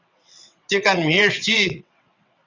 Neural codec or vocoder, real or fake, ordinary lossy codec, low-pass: vocoder, 22.05 kHz, 80 mel bands, WaveNeXt; fake; Opus, 64 kbps; 7.2 kHz